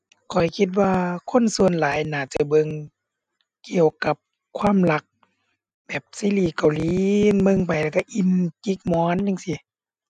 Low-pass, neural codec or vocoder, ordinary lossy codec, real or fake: 9.9 kHz; none; none; real